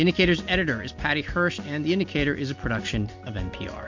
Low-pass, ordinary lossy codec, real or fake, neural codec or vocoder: 7.2 kHz; MP3, 48 kbps; real; none